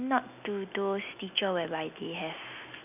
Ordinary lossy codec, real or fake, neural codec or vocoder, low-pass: none; real; none; 3.6 kHz